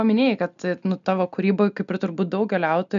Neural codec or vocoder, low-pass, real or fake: none; 7.2 kHz; real